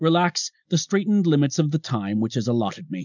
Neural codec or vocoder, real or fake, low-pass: none; real; 7.2 kHz